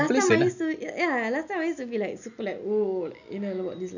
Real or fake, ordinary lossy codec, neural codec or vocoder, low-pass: real; none; none; 7.2 kHz